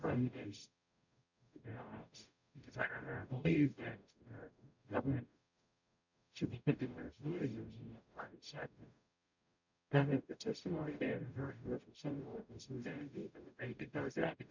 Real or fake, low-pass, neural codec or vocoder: fake; 7.2 kHz; codec, 44.1 kHz, 0.9 kbps, DAC